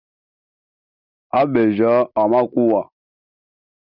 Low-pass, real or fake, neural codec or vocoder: 5.4 kHz; real; none